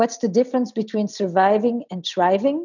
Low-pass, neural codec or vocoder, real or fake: 7.2 kHz; none; real